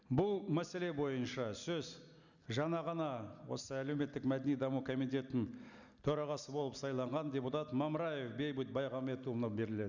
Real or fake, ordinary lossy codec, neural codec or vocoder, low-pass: real; none; none; 7.2 kHz